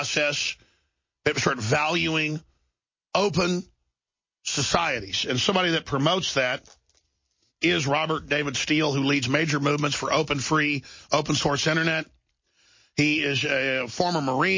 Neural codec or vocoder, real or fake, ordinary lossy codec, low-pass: none; real; MP3, 32 kbps; 7.2 kHz